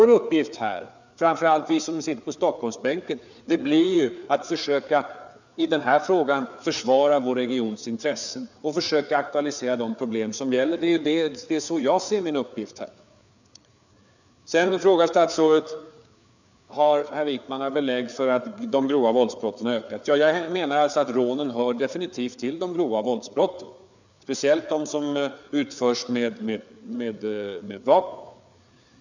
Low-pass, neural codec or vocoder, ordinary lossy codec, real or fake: 7.2 kHz; codec, 16 kHz, 4 kbps, FreqCodec, larger model; none; fake